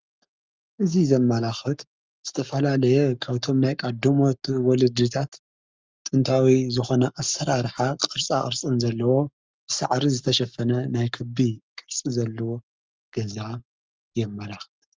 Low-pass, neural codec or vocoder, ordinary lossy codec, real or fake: 7.2 kHz; codec, 44.1 kHz, 7.8 kbps, Pupu-Codec; Opus, 32 kbps; fake